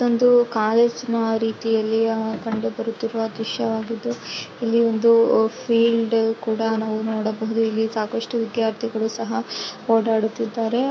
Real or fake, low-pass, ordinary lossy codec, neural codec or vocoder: fake; none; none; codec, 16 kHz, 6 kbps, DAC